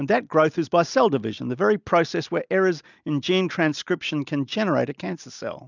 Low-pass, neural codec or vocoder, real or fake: 7.2 kHz; none; real